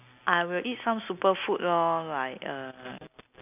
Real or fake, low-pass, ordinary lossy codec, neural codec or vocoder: real; 3.6 kHz; none; none